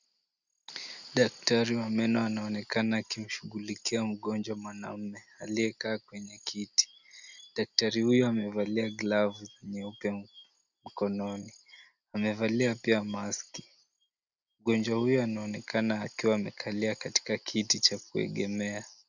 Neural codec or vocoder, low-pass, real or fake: none; 7.2 kHz; real